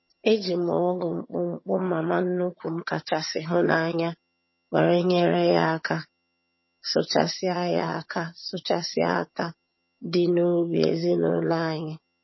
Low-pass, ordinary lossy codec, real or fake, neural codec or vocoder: 7.2 kHz; MP3, 24 kbps; fake; vocoder, 22.05 kHz, 80 mel bands, HiFi-GAN